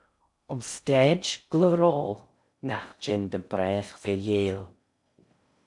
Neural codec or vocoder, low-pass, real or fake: codec, 16 kHz in and 24 kHz out, 0.6 kbps, FocalCodec, streaming, 2048 codes; 10.8 kHz; fake